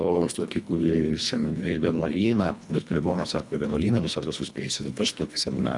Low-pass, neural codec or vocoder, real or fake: 10.8 kHz; codec, 24 kHz, 1.5 kbps, HILCodec; fake